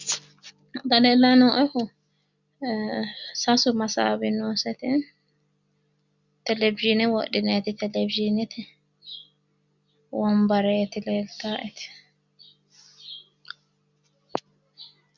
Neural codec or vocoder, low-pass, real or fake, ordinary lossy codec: none; 7.2 kHz; real; Opus, 64 kbps